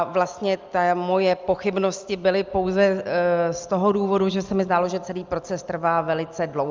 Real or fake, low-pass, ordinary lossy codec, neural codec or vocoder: real; 7.2 kHz; Opus, 24 kbps; none